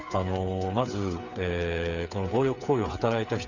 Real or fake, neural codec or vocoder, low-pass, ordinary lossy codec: fake; codec, 16 kHz, 8 kbps, FreqCodec, smaller model; 7.2 kHz; Opus, 64 kbps